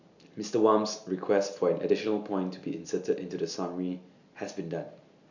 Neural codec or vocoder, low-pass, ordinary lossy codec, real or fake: none; 7.2 kHz; none; real